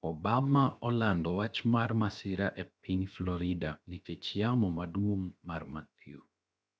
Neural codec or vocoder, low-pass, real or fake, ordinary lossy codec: codec, 16 kHz, 0.7 kbps, FocalCodec; none; fake; none